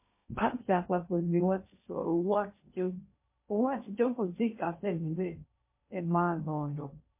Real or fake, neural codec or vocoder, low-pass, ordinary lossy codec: fake; codec, 16 kHz in and 24 kHz out, 0.6 kbps, FocalCodec, streaming, 2048 codes; 3.6 kHz; MP3, 24 kbps